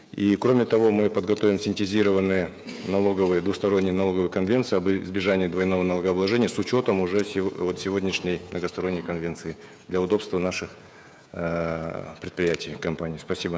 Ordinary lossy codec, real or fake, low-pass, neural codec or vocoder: none; fake; none; codec, 16 kHz, 16 kbps, FreqCodec, smaller model